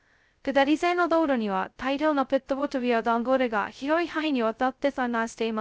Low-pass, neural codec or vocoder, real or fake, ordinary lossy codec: none; codec, 16 kHz, 0.2 kbps, FocalCodec; fake; none